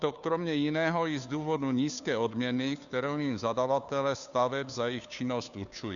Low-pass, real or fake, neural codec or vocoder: 7.2 kHz; fake; codec, 16 kHz, 2 kbps, FunCodec, trained on Chinese and English, 25 frames a second